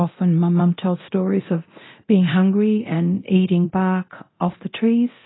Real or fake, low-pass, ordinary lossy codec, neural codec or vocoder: fake; 7.2 kHz; AAC, 16 kbps; codec, 24 kHz, 0.9 kbps, DualCodec